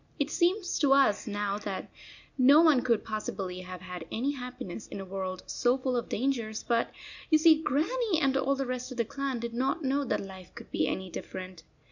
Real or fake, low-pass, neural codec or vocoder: real; 7.2 kHz; none